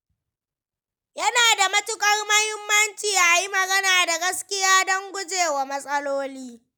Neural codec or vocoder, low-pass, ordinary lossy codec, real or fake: none; none; none; real